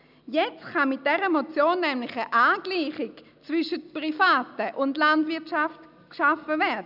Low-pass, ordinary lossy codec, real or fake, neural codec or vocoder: 5.4 kHz; none; real; none